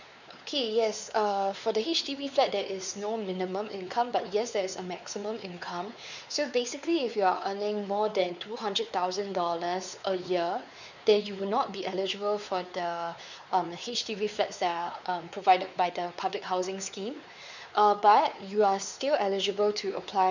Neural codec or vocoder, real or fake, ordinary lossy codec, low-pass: codec, 16 kHz, 4 kbps, X-Codec, WavLM features, trained on Multilingual LibriSpeech; fake; none; 7.2 kHz